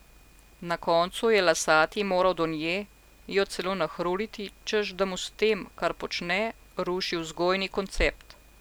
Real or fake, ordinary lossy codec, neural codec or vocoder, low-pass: real; none; none; none